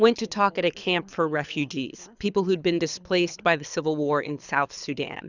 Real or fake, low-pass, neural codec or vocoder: fake; 7.2 kHz; codec, 24 kHz, 6 kbps, HILCodec